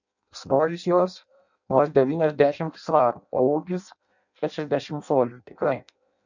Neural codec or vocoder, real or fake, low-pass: codec, 16 kHz in and 24 kHz out, 0.6 kbps, FireRedTTS-2 codec; fake; 7.2 kHz